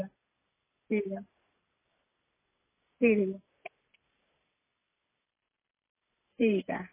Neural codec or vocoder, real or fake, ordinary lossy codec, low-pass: none; real; MP3, 16 kbps; 3.6 kHz